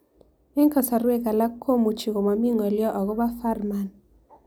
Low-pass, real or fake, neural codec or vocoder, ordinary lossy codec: none; real; none; none